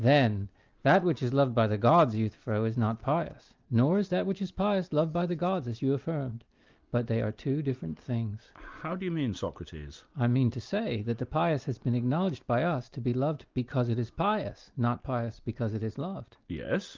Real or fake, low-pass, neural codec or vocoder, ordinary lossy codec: real; 7.2 kHz; none; Opus, 24 kbps